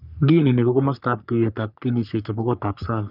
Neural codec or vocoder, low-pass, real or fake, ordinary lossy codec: codec, 44.1 kHz, 3.4 kbps, Pupu-Codec; 5.4 kHz; fake; none